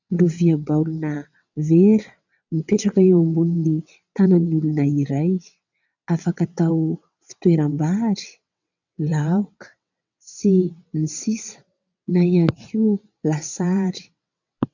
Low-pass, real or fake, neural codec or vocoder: 7.2 kHz; fake; vocoder, 22.05 kHz, 80 mel bands, WaveNeXt